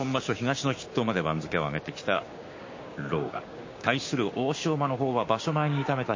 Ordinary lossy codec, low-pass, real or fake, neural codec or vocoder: MP3, 32 kbps; 7.2 kHz; fake; codec, 16 kHz, 6 kbps, DAC